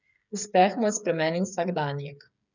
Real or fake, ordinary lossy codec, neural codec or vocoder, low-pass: fake; none; codec, 16 kHz, 8 kbps, FreqCodec, smaller model; 7.2 kHz